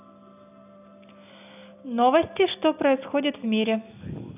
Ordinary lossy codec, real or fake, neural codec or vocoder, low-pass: none; real; none; 3.6 kHz